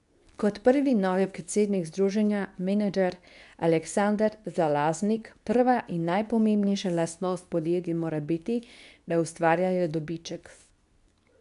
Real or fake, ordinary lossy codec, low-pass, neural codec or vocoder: fake; none; 10.8 kHz; codec, 24 kHz, 0.9 kbps, WavTokenizer, medium speech release version 2